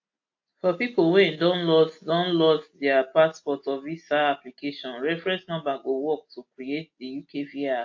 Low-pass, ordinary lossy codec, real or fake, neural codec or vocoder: 7.2 kHz; AAC, 48 kbps; real; none